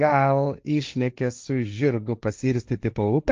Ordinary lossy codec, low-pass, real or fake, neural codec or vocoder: Opus, 24 kbps; 7.2 kHz; fake; codec, 16 kHz, 1.1 kbps, Voila-Tokenizer